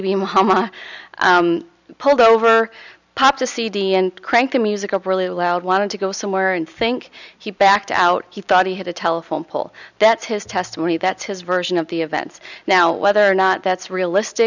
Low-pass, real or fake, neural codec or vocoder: 7.2 kHz; real; none